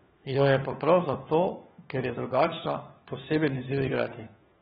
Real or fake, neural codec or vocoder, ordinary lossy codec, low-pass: fake; codec, 16 kHz, 4 kbps, FunCodec, trained on LibriTTS, 50 frames a second; AAC, 16 kbps; 7.2 kHz